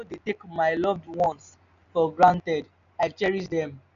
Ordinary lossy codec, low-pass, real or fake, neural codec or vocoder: none; 7.2 kHz; real; none